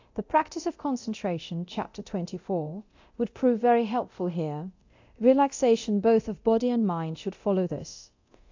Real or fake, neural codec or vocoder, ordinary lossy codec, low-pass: fake; codec, 24 kHz, 0.9 kbps, DualCodec; AAC, 48 kbps; 7.2 kHz